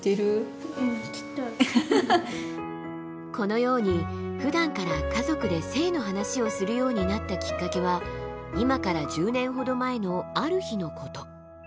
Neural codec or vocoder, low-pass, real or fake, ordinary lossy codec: none; none; real; none